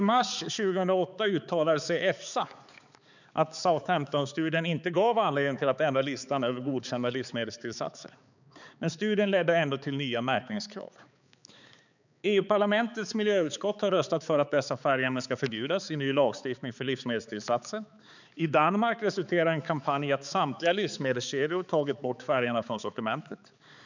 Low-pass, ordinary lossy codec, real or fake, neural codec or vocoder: 7.2 kHz; none; fake; codec, 16 kHz, 4 kbps, X-Codec, HuBERT features, trained on balanced general audio